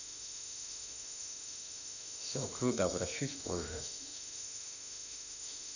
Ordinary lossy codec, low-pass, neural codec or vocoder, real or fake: none; 7.2 kHz; autoencoder, 48 kHz, 32 numbers a frame, DAC-VAE, trained on Japanese speech; fake